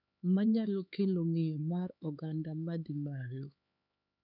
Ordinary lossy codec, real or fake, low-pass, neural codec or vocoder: none; fake; 5.4 kHz; codec, 16 kHz, 4 kbps, X-Codec, HuBERT features, trained on LibriSpeech